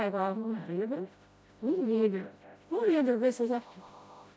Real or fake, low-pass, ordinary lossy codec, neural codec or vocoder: fake; none; none; codec, 16 kHz, 0.5 kbps, FreqCodec, smaller model